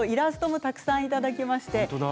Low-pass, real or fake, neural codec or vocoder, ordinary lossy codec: none; real; none; none